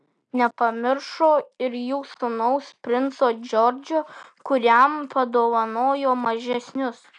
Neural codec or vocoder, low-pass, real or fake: none; 9.9 kHz; real